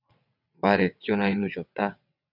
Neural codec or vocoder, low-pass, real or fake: vocoder, 22.05 kHz, 80 mel bands, WaveNeXt; 5.4 kHz; fake